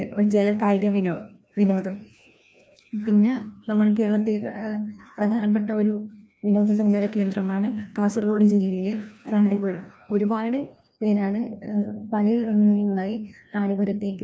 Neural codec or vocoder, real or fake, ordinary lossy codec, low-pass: codec, 16 kHz, 1 kbps, FreqCodec, larger model; fake; none; none